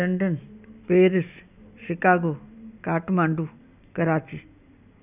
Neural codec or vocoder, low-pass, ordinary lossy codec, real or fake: none; 3.6 kHz; none; real